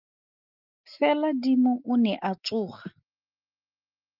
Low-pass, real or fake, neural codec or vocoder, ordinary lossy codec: 5.4 kHz; real; none; Opus, 32 kbps